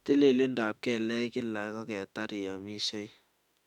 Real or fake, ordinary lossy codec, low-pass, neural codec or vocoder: fake; none; 19.8 kHz; autoencoder, 48 kHz, 32 numbers a frame, DAC-VAE, trained on Japanese speech